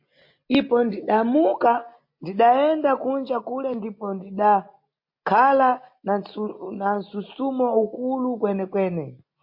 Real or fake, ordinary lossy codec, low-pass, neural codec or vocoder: real; MP3, 32 kbps; 5.4 kHz; none